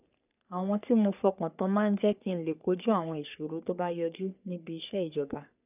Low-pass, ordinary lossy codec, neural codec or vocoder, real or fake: 3.6 kHz; none; codec, 44.1 kHz, 3.4 kbps, Pupu-Codec; fake